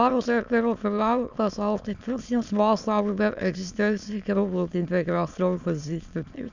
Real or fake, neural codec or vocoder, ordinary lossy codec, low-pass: fake; autoencoder, 22.05 kHz, a latent of 192 numbers a frame, VITS, trained on many speakers; Opus, 64 kbps; 7.2 kHz